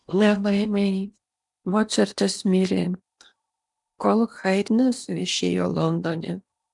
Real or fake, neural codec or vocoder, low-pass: fake; codec, 16 kHz in and 24 kHz out, 0.8 kbps, FocalCodec, streaming, 65536 codes; 10.8 kHz